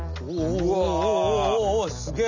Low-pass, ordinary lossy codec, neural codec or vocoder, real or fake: 7.2 kHz; none; none; real